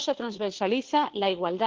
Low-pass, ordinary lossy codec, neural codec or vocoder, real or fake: 7.2 kHz; Opus, 16 kbps; codec, 16 kHz, 2 kbps, FunCodec, trained on Chinese and English, 25 frames a second; fake